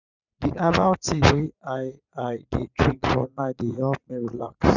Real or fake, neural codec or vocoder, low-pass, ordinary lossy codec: fake; vocoder, 44.1 kHz, 80 mel bands, Vocos; 7.2 kHz; none